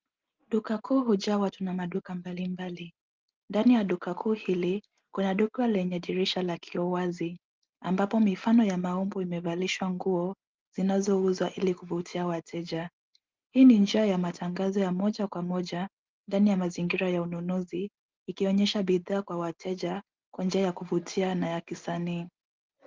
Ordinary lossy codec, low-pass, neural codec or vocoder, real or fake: Opus, 32 kbps; 7.2 kHz; none; real